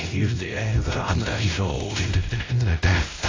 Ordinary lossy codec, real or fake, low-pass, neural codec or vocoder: AAC, 32 kbps; fake; 7.2 kHz; codec, 16 kHz, 0.5 kbps, X-Codec, WavLM features, trained on Multilingual LibriSpeech